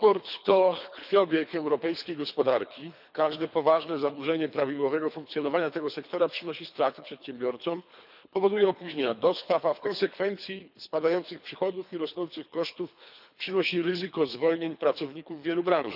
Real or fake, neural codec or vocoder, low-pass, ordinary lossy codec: fake; codec, 24 kHz, 3 kbps, HILCodec; 5.4 kHz; none